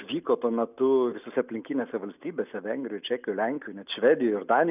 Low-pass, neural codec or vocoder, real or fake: 3.6 kHz; none; real